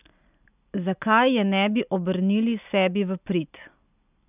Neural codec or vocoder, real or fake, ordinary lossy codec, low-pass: none; real; none; 3.6 kHz